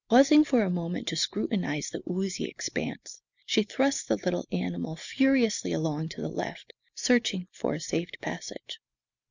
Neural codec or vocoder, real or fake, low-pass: none; real; 7.2 kHz